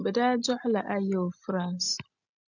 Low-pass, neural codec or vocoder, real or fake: 7.2 kHz; none; real